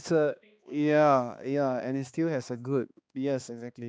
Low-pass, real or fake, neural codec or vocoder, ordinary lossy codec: none; fake; codec, 16 kHz, 2 kbps, X-Codec, HuBERT features, trained on balanced general audio; none